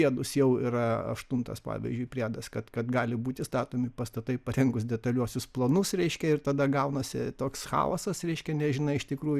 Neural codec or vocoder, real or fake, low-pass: none; real; 14.4 kHz